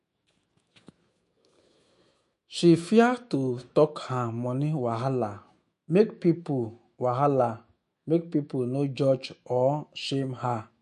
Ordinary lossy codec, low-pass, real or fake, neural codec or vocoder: MP3, 48 kbps; 14.4 kHz; fake; autoencoder, 48 kHz, 128 numbers a frame, DAC-VAE, trained on Japanese speech